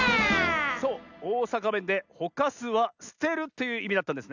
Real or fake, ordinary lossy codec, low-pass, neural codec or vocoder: real; none; 7.2 kHz; none